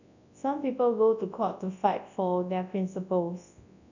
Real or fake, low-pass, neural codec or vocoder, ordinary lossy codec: fake; 7.2 kHz; codec, 24 kHz, 0.9 kbps, WavTokenizer, large speech release; none